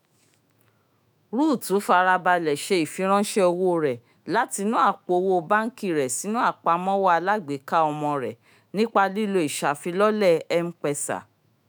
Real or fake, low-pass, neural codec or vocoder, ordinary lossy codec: fake; none; autoencoder, 48 kHz, 128 numbers a frame, DAC-VAE, trained on Japanese speech; none